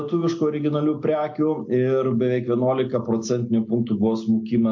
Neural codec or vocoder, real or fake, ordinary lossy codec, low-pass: none; real; MP3, 48 kbps; 7.2 kHz